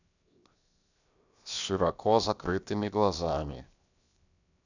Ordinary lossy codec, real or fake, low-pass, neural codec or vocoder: none; fake; 7.2 kHz; codec, 16 kHz, 0.7 kbps, FocalCodec